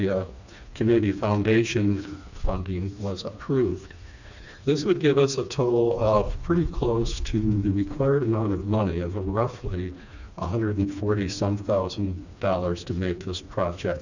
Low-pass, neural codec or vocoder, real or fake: 7.2 kHz; codec, 16 kHz, 2 kbps, FreqCodec, smaller model; fake